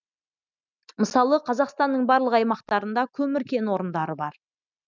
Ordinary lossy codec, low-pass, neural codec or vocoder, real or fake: none; 7.2 kHz; none; real